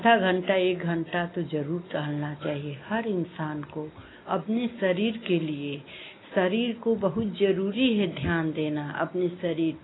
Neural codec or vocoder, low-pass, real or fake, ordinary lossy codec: none; 7.2 kHz; real; AAC, 16 kbps